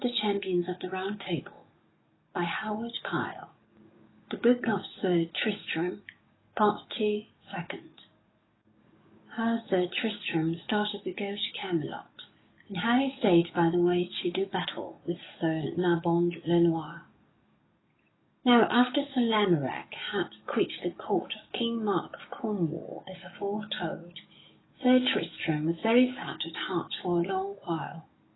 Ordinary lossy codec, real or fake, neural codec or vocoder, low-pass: AAC, 16 kbps; fake; codec, 44.1 kHz, 7.8 kbps, DAC; 7.2 kHz